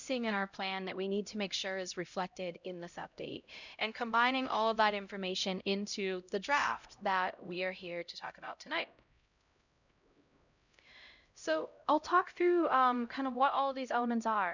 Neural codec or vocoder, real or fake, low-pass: codec, 16 kHz, 0.5 kbps, X-Codec, HuBERT features, trained on LibriSpeech; fake; 7.2 kHz